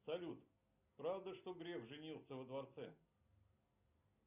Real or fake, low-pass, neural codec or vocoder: real; 3.6 kHz; none